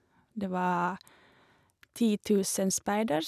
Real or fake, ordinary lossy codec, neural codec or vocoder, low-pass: real; none; none; 14.4 kHz